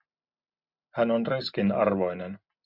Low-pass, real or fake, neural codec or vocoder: 5.4 kHz; real; none